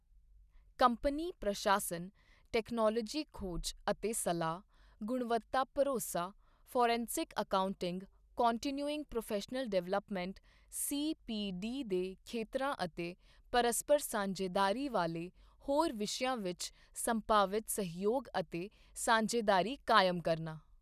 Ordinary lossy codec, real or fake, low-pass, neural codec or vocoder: none; real; 14.4 kHz; none